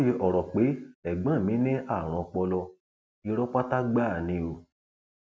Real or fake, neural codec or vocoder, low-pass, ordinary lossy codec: real; none; none; none